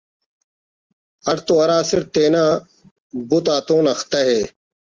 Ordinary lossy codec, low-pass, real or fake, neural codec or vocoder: Opus, 24 kbps; 7.2 kHz; real; none